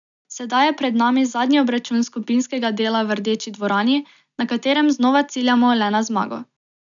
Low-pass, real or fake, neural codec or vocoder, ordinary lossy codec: 7.2 kHz; real; none; none